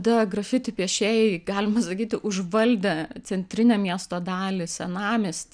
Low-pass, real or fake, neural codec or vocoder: 9.9 kHz; real; none